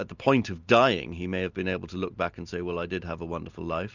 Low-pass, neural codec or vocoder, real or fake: 7.2 kHz; none; real